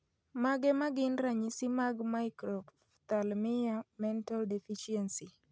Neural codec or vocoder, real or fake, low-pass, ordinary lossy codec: none; real; none; none